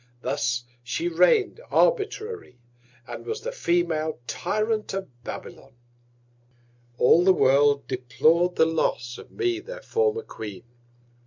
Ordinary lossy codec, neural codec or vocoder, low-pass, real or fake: MP3, 64 kbps; none; 7.2 kHz; real